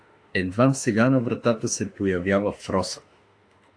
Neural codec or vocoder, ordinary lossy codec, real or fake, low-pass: autoencoder, 48 kHz, 32 numbers a frame, DAC-VAE, trained on Japanese speech; AAC, 48 kbps; fake; 9.9 kHz